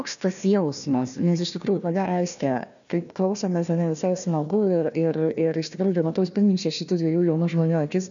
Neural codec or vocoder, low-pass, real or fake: codec, 16 kHz, 1 kbps, FunCodec, trained on Chinese and English, 50 frames a second; 7.2 kHz; fake